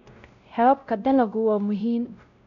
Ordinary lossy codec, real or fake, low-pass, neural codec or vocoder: none; fake; 7.2 kHz; codec, 16 kHz, 0.5 kbps, X-Codec, WavLM features, trained on Multilingual LibriSpeech